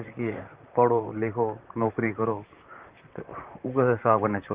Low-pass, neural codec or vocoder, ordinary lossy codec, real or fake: 3.6 kHz; vocoder, 44.1 kHz, 128 mel bands, Pupu-Vocoder; Opus, 32 kbps; fake